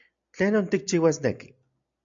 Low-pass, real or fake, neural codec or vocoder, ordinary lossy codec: 7.2 kHz; real; none; MP3, 64 kbps